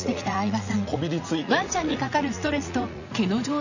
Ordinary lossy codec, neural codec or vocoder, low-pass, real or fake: none; vocoder, 44.1 kHz, 80 mel bands, Vocos; 7.2 kHz; fake